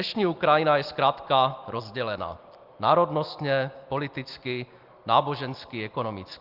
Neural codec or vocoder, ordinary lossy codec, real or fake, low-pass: none; Opus, 32 kbps; real; 5.4 kHz